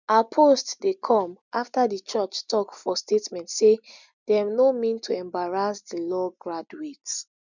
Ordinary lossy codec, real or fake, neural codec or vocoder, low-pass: none; real; none; 7.2 kHz